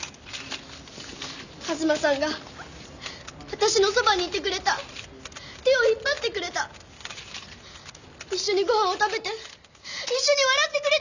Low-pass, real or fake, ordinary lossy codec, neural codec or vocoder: 7.2 kHz; real; none; none